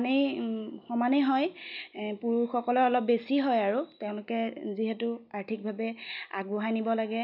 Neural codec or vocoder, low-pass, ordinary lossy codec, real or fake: none; 5.4 kHz; none; real